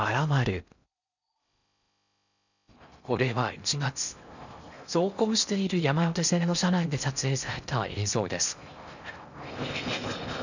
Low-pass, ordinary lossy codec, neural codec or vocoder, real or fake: 7.2 kHz; none; codec, 16 kHz in and 24 kHz out, 0.6 kbps, FocalCodec, streaming, 4096 codes; fake